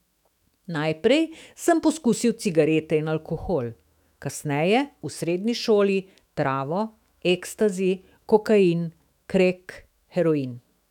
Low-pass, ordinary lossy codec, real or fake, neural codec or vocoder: 19.8 kHz; none; fake; autoencoder, 48 kHz, 128 numbers a frame, DAC-VAE, trained on Japanese speech